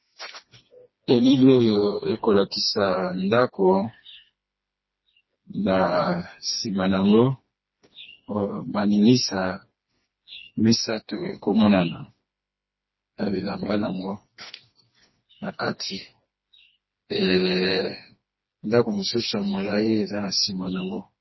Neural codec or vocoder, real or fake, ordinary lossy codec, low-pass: codec, 16 kHz, 2 kbps, FreqCodec, smaller model; fake; MP3, 24 kbps; 7.2 kHz